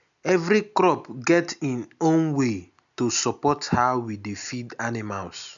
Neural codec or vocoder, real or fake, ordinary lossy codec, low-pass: none; real; none; 7.2 kHz